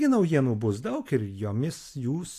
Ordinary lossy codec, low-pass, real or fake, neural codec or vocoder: AAC, 64 kbps; 14.4 kHz; real; none